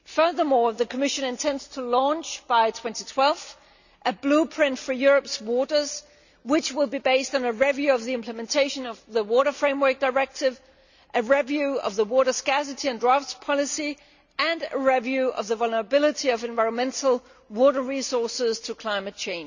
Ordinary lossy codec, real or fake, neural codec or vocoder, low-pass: none; real; none; 7.2 kHz